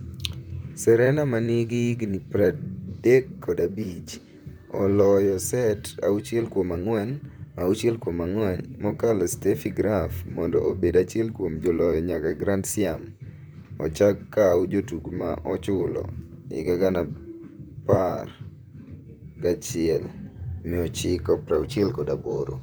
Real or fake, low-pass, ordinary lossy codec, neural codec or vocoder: fake; none; none; vocoder, 44.1 kHz, 128 mel bands, Pupu-Vocoder